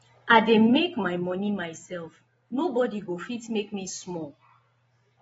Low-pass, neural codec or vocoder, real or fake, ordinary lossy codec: 19.8 kHz; none; real; AAC, 24 kbps